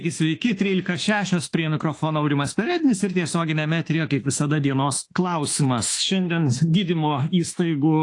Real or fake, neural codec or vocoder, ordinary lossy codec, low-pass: fake; autoencoder, 48 kHz, 32 numbers a frame, DAC-VAE, trained on Japanese speech; AAC, 48 kbps; 10.8 kHz